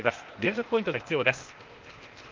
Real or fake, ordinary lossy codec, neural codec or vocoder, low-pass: fake; Opus, 24 kbps; codec, 16 kHz, 0.8 kbps, ZipCodec; 7.2 kHz